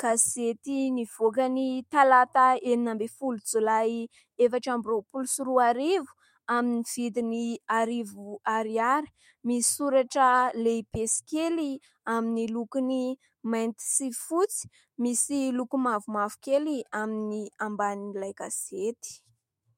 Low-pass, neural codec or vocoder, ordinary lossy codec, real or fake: 19.8 kHz; autoencoder, 48 kHz, 128 numbers a frame, DAC-VAE, trained on Japanese speech; MP3, 64 kbps; fake